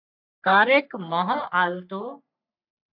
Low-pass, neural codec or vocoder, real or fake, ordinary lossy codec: 5.4 kHz; codec, 44.1 kHz, 3.4 kbps, Pupu-Codec; fake; AAC, 48 kbps